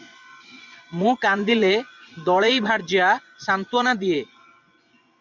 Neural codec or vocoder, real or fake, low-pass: vocoder, 24 kHz, 100 mel bands, Vocos; fake; 7.2 kHz